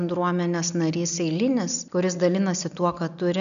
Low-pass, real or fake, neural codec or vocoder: 7.2 kHz; real; none